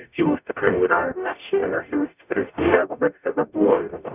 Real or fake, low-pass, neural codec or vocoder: fake; 3.6 kHz; codec, 44.1 kHz, 0.9 kbps, DAC